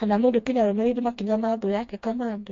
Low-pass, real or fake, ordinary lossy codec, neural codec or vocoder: 7.2 kHz; fake; MP3, 48 kbps; codec, 16 kHz, 2 kbps, FreqCodec, smaller model